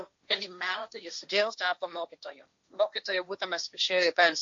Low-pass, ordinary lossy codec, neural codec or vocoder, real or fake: 7.2 kHz; MP3, 48 kbps; codec, 16 kHz, 1.1 kbps, Voila-Tokenizer; fake